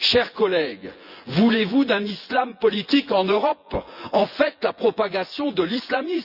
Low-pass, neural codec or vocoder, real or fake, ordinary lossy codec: 5.4 kHz; vocoder, 24 kHz, 100 mel bands, Vocos; fake; Opus, 64 kbps